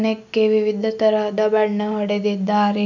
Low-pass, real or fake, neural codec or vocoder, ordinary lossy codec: 7.2 kHz; real; none; none